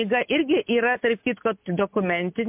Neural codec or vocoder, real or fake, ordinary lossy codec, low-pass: none; real; MP3, 32 kbps; 3.6 kHz